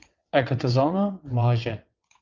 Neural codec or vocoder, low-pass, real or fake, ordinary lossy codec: vocoder, 22.05 kHz, 80 mel bands, WaveNeXt; 7.2 kHz; fake; Opus, 24 kbps